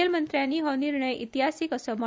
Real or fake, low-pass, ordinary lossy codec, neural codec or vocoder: real; none; none; none